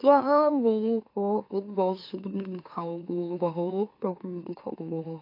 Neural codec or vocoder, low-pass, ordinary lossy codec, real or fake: autoencoder, 44.1 kHz, a latent of 192 numbers a frame, MeloTTS; 5.4 kHz; AAC, 32 kbps; fake